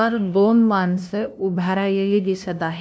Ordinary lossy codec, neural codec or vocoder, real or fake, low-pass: none; codec, 16 kHz, 0.5 kbps, FunCodec, trained on LibriTTS, 25 frames a second; fake; none